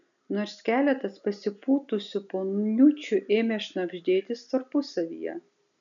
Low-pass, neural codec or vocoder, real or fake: 7.2 kHz; none; real